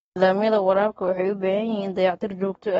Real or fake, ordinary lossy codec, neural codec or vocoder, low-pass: fake; AAC, 24 kbps; codec, 44.1 kHz, 7.8 kbps, Pupu-Codec; 19.8 kHz